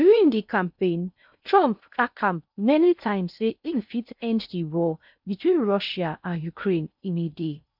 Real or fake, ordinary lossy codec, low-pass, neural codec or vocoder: fake; none; 5.4 kHz; codec, 16 kHz in and 24 kHz out, 0.6 kbps, FocalCodec, streaming, 2048 codes